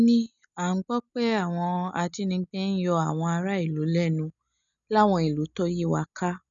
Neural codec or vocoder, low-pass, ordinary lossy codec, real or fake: none; 7.2 kHz; none; real